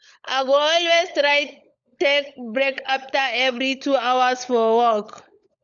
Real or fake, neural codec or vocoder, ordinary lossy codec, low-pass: fake; codec, 16 kHz, 16 kbps, FunCodec, trained on LibriTTS, 50 frames a second; none; 7.2 kHz